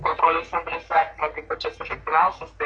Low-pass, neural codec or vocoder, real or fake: 9.9 kHz; codec, 44.1 kHz, 3.4 kbps, Pupu-Codec; fake